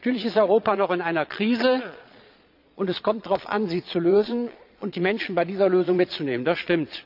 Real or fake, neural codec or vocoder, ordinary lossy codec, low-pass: fake; vocoder, 22.05 kHz, 80 mel bands, Vocos; none; 5.4 kHz